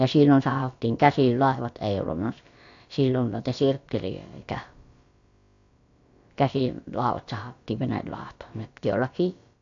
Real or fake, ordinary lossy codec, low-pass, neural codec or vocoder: fake; AAC, 48 kbps; 7.2 kHz; codec, 16 kHz, about 1 kbps, DyCAST, with the encoder's durations